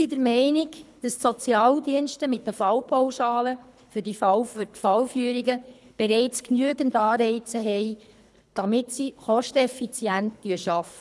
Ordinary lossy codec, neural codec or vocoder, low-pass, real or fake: none; codec, 24 kHz, 3 kbps, HILCodec; none; fake